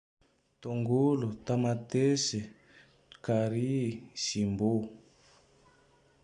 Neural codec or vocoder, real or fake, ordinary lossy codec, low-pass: none; real; none; 9.9 kHz